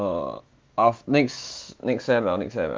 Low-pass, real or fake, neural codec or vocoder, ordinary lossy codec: 7.2 kHz; fake; autoencoder, 48 kHz, 128 numbers a frame, DAC-VAE, trained on Japanese speech; Opus, 32 kbps